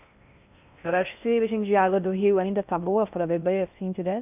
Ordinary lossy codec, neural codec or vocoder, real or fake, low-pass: none; codec, 16 kHz in and 24 kHz out, 0.6 kbps, FocalCodec, streaming, 4096 codes; fake; 3.6 kHz